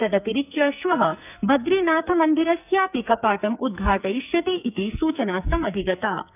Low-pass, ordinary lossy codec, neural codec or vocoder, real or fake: 3.6 kHz; none; codec, 44.1 kHz, 2.6 kbps, SNAC; fake